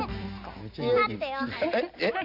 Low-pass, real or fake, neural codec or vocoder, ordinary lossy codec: 5.4 kHz; real; none; none